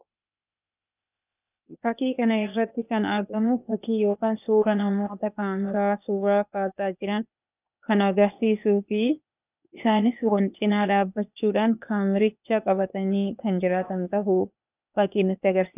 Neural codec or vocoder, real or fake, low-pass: codec, 16 kHz, 0.8 kbps, ZipCodec; fake; 3.6 kHz